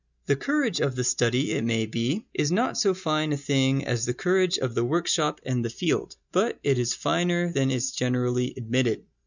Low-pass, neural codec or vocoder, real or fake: 7.2 kHz; none; real